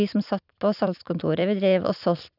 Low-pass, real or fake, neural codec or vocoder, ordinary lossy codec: 5.4 kHz; fake; vocoder, 44.1 kHz, 128 mel bands every 256 samples, BigVGAN v2; none